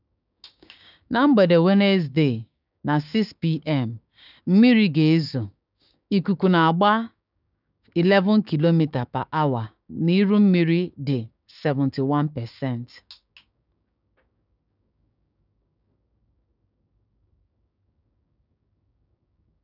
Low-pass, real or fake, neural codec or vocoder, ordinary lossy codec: 5.4 kHz; fake; codec, 16 kHz, 6 kbps, DAC; none